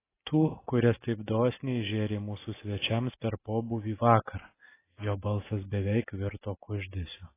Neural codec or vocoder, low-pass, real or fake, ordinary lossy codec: none; 3.6 kHz; real; AAC, 16 kbps